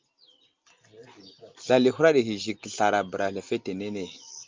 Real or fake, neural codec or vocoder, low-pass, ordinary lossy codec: real; none; 7.2 kHz; Opus, 24 kbps